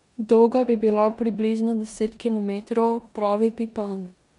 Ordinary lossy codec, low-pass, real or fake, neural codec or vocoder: MP3, 96 kbps; 10.8 kHz; fake; codec, 16 kHz in and 24 kHz out, 0.9 kbps, LongCat-Audio-Codec, four codebook decoder